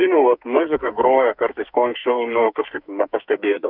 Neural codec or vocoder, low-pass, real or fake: codec, 44.1 kHz, 2.6 kbps, SNAC; 5.4 kHz; fake